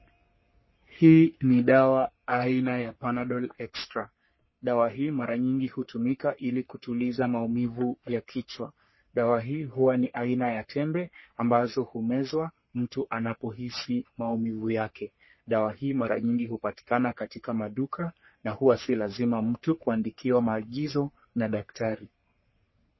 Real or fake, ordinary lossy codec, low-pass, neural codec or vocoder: fake; MP3, 24 kbps; 7.2 kHz; codec, 44.1 kHz, 3.4 kbps, Pupu-Codec